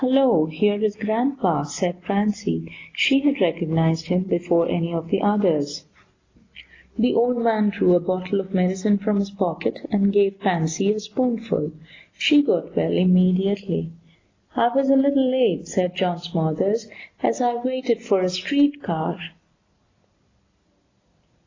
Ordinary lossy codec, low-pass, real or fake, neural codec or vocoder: AAC, 32 kbps; 7.2 kHz; real; none